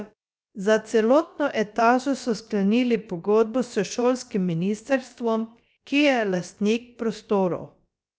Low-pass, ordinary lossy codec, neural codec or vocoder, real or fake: none; none; codec, 16 kHz, about 1 kbps, DyCAST, with the encoder's durations; fake